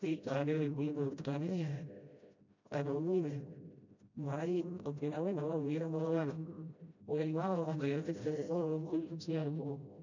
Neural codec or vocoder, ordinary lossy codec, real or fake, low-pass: codec, 16 kHz, 0.5 kbps, FreqCodec, smaller model; none; fake; 7.2 kHz